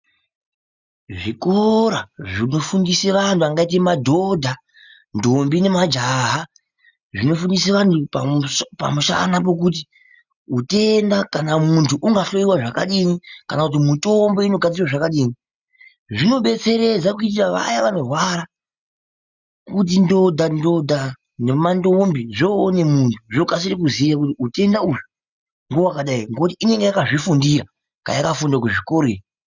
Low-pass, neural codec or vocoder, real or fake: 7.2 kHz; none; real